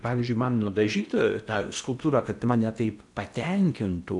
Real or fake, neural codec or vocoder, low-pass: fake; codec, 16 kHz in and 24 kHz out, 0.8 kbps, FocalCodec, streaming, 65536 codes; 10.8 kHz